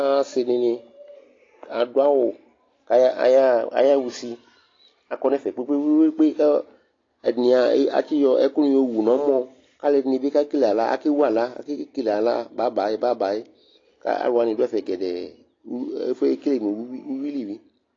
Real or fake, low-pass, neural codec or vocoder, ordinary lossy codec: real; 7.2 kHz; none; AAC, 32 kbps